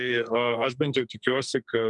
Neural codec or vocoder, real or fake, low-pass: codec, 44.1 kHz, 2.6 kbps, SNAC; fake; 10.8 kHz